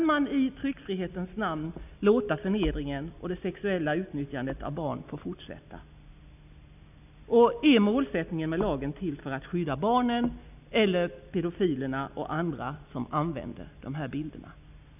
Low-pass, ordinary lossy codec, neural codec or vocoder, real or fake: 3.6 kHz; none; none; real